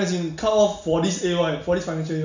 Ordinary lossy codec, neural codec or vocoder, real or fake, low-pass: none; none; real; 7.2 kHz